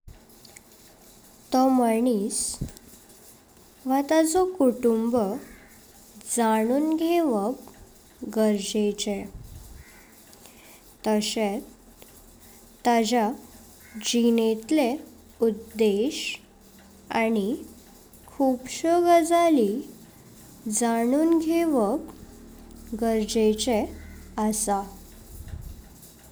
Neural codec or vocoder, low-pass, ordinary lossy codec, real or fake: none; none; none; real